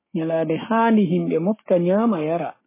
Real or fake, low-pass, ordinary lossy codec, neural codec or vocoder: fake; 3.6 kHz; MP3, 16 kbps; codec, 16 kHz, 6 kbps, DAC